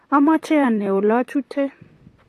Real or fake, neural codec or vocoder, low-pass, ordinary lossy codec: fake; vocoder, 44.1 kHz, 128 mel bands, Pupu-Vocoder; 14.4 kHz; AAC, 48 kbps